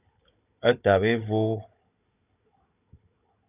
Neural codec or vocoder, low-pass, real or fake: codec, 16 kHz, 16 kbps, FunCodec, trained on Chinese and English, 50 frames a second; 3.6 kHz; fake